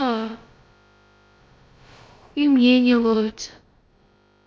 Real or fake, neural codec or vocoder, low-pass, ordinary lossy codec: fake; codec, 16 kHz, about 1 kbps, DyCAST, with the encoder's durations; none; none